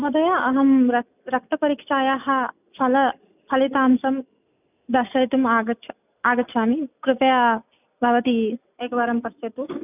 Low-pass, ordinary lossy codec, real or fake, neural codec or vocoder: 3.6 kHz; none; real; none